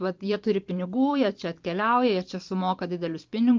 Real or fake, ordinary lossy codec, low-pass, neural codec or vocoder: fake; Opus, 24 kbps; 7.2 kHz; vocoder, 44.1 kHz, 128 mel bands every 512 samples, BigVGAN v2